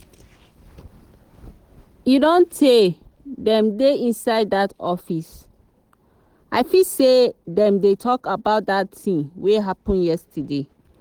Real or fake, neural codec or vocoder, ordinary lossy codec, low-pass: real; none; Opus, 16 kbps; 19.8 kHz